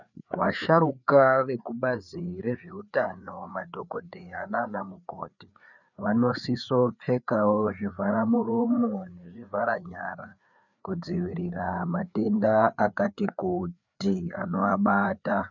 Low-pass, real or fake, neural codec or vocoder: 7.2 kHz; fake; codec, 16 kHz, 4 kbps, FreqCodec, larger model